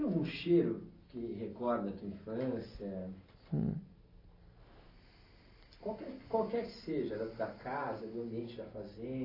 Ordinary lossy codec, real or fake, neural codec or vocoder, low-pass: AAC, 24 kbps; real; none; 5.4 kHz